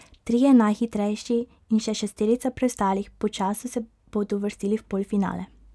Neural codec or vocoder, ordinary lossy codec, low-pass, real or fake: none; none; none; real